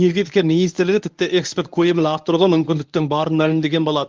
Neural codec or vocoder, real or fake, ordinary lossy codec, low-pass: codec, 24 kHz, 0.9 kbps, WavTokenizer, medium speech release version 1; fake; Opus, 24 kbps; 7.2 kHz